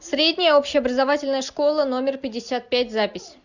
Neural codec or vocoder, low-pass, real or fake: none; 7.2 kHz; real